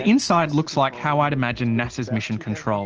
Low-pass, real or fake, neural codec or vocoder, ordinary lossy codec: 7.2 kHz; real; none; Opus, 16 kbps